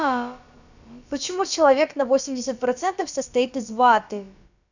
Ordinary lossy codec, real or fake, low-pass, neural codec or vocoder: none; fake; 7.2 kHz; codec, 16 kHz, about 1 kbps, DyCAST, with the encoder's durations